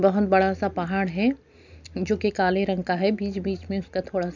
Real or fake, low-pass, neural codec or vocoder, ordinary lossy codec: real; 7.2 kHz; none; none